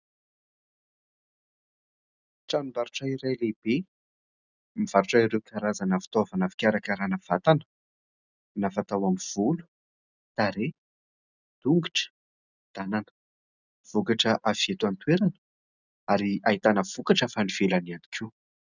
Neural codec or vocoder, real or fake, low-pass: none; real; 7.2 kHz